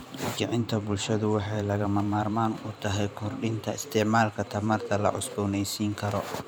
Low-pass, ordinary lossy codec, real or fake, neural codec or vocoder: none; none; fake; vocoder, 44.1 kHz, 128 mel bands every 512 samples, BigVGAN v2